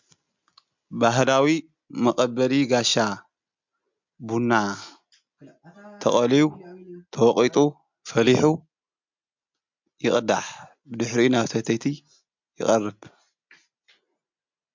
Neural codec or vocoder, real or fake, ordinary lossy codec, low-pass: none; real; MP3, 64 kbps; 7.2 kHz